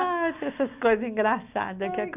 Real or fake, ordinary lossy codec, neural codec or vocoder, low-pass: real; none; none; 3.6 kHz